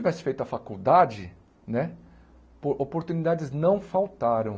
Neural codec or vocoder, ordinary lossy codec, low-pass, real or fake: none; none; none; real